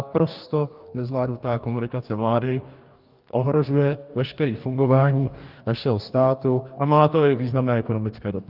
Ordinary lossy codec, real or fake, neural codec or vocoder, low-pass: Opus, 32 kbps; fake; codec, 44.1 kHz, 2.6 kbps, DAC; 5.4 kHz